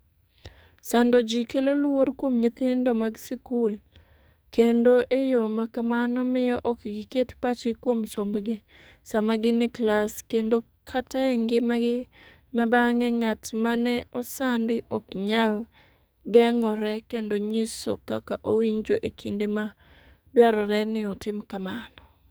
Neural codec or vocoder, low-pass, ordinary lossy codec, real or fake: codec, 44.1 kHz, 2.6 kbps, SNAC; none; none; fake